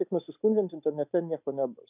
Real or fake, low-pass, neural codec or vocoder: real; 3.6 kHz; none